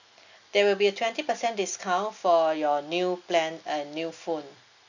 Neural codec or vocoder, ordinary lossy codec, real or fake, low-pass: none; none; real; 7.2 kHz